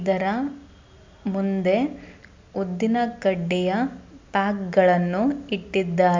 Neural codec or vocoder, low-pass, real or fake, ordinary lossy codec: none; 7.2 kHz; real; MP3, 64 kbps